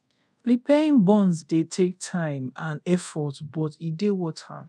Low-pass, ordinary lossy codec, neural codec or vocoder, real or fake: none; none; codec, 24 kHz, 0.5 kbps, DualCodec; fake